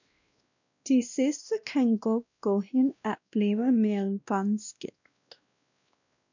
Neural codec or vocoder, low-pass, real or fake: codec, 16 kHz, 1 kbps, X-Codec, WavLM features, trained on Multilingual LibriSpeech; 7.2 kHz; fake